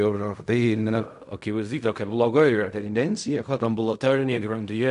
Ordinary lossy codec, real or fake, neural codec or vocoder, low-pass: AAC, 96 kbps; fake; codec, 16 kHz in and 24 kHz out, 0.4 kbps, LongCat-Audio-Codec, fine tuned four codebook decoder; 10.8 kHz